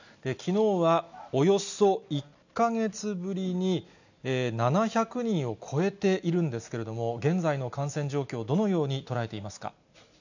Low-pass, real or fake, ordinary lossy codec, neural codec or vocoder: 7.2 kHz; real; none; none